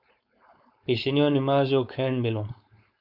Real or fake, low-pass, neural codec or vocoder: fake; 5.4 kHz; codec, 16 kHz, 4.8 kbps, FACodec